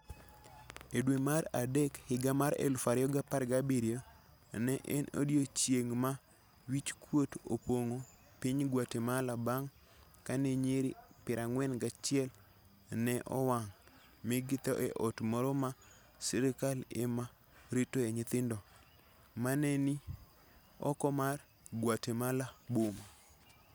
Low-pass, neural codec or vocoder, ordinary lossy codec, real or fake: none; none; none; real